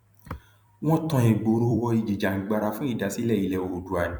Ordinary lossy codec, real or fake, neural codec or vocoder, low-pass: none; fake; vocoder, 44.1 kHz, 128 mel bands every 256 samples, BigVGAN v2; 19.8 kHz